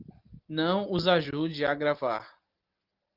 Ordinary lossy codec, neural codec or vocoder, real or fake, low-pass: Opus, 32 kbps; none; real; 5.4 kHz